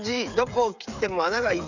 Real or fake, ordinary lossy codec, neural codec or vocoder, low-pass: fake; none; codec, 16 kHz, 8 kbps, FreqCodec, smaller model; 7.2 kHz